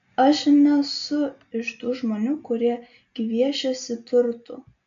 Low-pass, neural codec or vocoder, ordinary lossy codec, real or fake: 7.2 kHz; none; AAC, 64 kbps; real